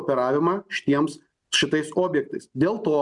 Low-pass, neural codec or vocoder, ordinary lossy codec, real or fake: 10.8 kHz; none; MP3, 96 kbps; real